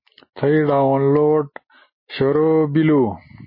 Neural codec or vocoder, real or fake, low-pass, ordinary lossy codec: none; real; 5.4 kHz; MP3, 24 kbps